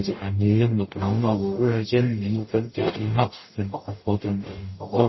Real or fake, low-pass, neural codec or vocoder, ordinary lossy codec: fake; 7.2 kHz; codec, 44.1 kHz, 0.9 kbps, DAC; MP3, 24 kbps